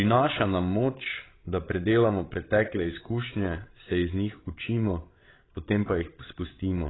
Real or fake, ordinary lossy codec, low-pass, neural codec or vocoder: fake; AAC, 16 kbps; 7.2 kHz; codec, 16 kHz, 8 kbps, FreqCodec, larger model